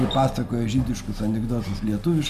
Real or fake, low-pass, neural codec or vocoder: real; 14.4 kHz; none